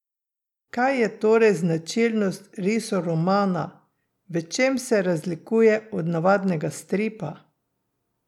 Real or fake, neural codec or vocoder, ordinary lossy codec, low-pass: real; none; none; 19.8 kHz